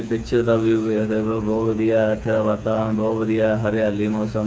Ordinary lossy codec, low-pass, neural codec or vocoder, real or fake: none; none; codec, 16 kHz, 4 kbps, FreqCodec, smaller model; fake